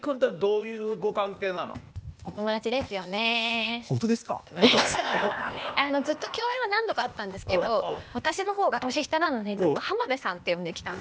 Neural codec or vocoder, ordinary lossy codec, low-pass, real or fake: codec, 16 kHz, 0.8 kbps, ZipCodec; none; none; fake